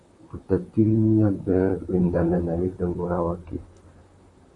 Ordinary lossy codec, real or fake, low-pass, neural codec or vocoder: MP3, 64 kbps; fake; 10.8 kHz; vocoder, 44.1 kHz, 128 mel bands, Pupu-Vocoder